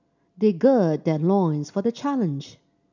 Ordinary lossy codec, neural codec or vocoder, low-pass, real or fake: none; none; 7.2 kHz; real